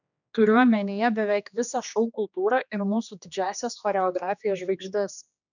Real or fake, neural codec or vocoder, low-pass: fake; codec, 16 kHz, 2 kbps, X-Codec, HuBERT features, trained on general audio; 7.2 kHz